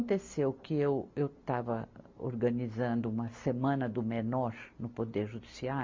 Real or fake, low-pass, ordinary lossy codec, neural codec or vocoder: real; 7.2 kHz; MP3, 48 kbps; none